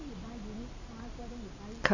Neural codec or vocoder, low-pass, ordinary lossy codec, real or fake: none; 7.2 kHz; none; real